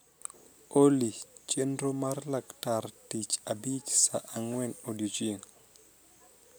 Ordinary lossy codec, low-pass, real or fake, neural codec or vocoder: none; none; real; none